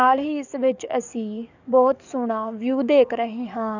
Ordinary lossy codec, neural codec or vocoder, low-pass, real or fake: none; codec, 44.1 kHz, 7.8 kbps, DAC; 7.2 kHz; fake